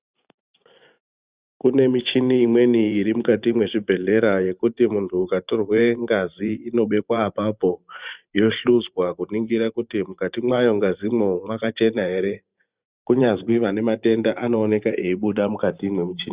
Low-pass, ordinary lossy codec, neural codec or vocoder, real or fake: 3.6 kHz; Opus, 64 kbps; vocoder, 44.1 kHz, 128 mel bands every 512 samples, BigVGAN v2; fake